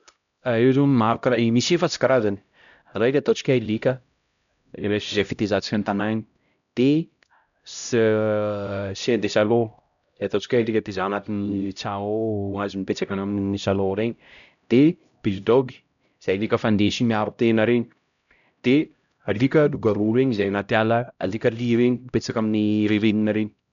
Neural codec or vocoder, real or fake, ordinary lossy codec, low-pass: codec, 16 kHz, 0.5 kbps, X-Codec, HuBERT features, trained on LibriSpeech; fake; none; 7.2 kHz